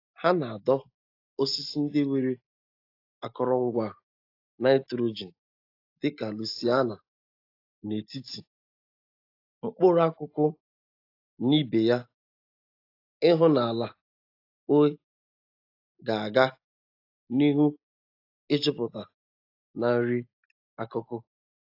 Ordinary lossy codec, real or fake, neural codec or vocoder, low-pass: AAC, 32 kbps; real; none; 5.4 kHz